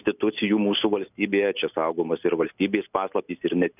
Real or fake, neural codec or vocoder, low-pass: real; none; 3.6 kHz